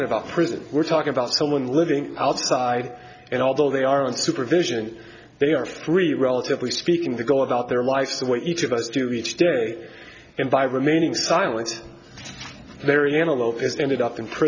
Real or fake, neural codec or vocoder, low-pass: real; none; 7.2 kHz